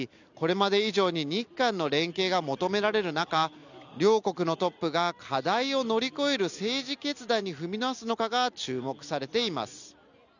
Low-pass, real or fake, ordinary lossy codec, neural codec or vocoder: 7.2 kHz; real; none; none